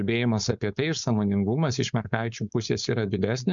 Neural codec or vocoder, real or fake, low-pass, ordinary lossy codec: codec, 16 kHz, 4.8 kbps, FACodec; fake; 7.2 kHz; MP3, 96 kbps